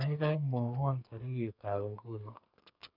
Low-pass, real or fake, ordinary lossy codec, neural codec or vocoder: 5.4 kHz; fake; none; codec, 44.1 kHz, 2.6 kbps, SNAC